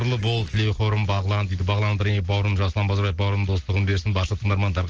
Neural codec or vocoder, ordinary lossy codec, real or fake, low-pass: none; Opus, 16 kbps; real; 7.2 kHz